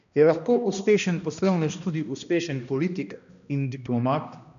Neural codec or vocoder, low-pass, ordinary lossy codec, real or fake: codec, 16 kHz, 1 kbps, X-Codec, HuBERT features, trained on balanced general audio; 7.2 kHz; none; fake